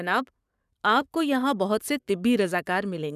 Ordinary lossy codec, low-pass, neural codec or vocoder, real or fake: none; 14.4 kHz; codec, 44.1 kHz, 7.8 kbps, Pupu-Codec; fake